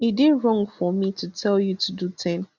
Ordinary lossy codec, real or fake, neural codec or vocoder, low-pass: none; real; none; 7.2 kHz